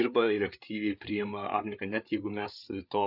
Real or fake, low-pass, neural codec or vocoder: fake; 5.4 kHz; codec, 16 kHz, 8 kbps, FreqCodec, larger model